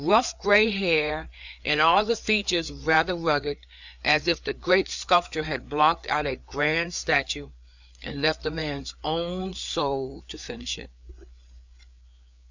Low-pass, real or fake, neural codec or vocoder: 7.2 kHz; fake; codec, 16 kHz, 4 kbps, FreqCodec, larger model